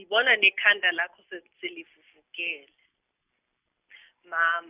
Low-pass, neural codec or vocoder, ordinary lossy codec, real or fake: 3.6 kHz; none; Opus, 64 kbps; real